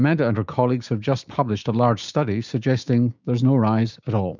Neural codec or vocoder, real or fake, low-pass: codec, 16 kHz, 6 kbps, DAC; fake; 7.2 kHz